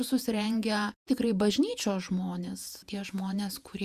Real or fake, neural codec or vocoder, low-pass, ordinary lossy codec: fake; vocoder, 48 kHz, 128 mel bands, Vocos; 14.4 kHz; Opus, 64 kbps